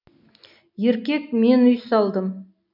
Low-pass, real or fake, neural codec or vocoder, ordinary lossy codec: 5.4 kHz; real; none; none